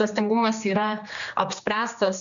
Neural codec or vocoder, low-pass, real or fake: codec, 16 kHz, 2 kbps, X-Codec, HuBERT features, trained on general audio; 7.2 kHz; fake